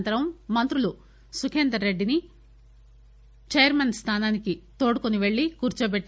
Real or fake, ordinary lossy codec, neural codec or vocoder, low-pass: real; none; none; none